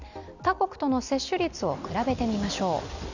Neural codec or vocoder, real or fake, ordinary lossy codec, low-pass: none; real; none; 7.2 kHz